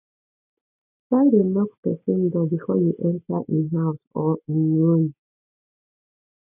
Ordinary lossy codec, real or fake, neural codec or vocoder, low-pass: none; real; none; 3.6 kHz